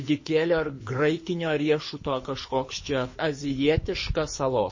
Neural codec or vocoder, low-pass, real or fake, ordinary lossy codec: codec, 24 kHz, 6 kbps, HILCodec; 7.2 kHz; fake; MP3, 32 kbps